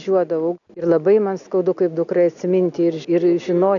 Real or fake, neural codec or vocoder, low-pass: real; none; 7.2 kHz